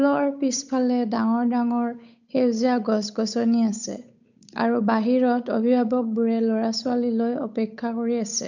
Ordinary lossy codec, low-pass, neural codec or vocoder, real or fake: none; 7.2 kHz; codec, 16 kHz, 8 kbps, FunCodec, trained on Chinese and English, 25 frames a second; fake